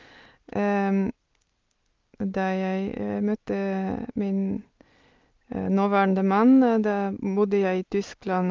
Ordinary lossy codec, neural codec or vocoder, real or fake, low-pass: Opus, 32 kbps; none; real; 7.2 kHz